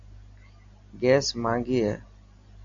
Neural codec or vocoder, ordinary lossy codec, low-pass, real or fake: none; MP3, 64 kbps; 7.2 kHz; real